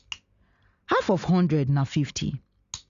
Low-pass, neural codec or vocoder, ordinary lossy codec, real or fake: 7.2 kHz; none; Opus, 64 kbps; real